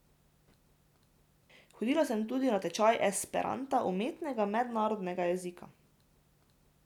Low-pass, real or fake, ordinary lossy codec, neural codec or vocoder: 19.8 kHz; real; none; none